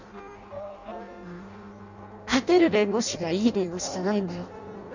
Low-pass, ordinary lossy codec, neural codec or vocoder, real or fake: 7.2 kHz; none; codec, 16 kHz in and 24 kHz out, 0.6 kbps, FireRedTTS-2 codec; fake